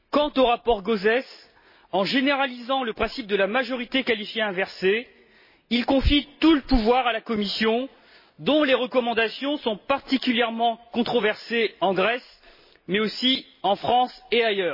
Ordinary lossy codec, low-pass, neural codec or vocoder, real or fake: MP3, 24 kbps; 5.4 kHz; none; real